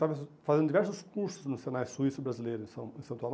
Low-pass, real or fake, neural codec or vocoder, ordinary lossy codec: none; real; none; none